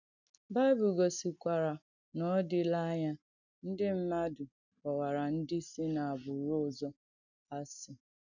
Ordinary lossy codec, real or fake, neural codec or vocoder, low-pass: none; real; none; 7.2 kHz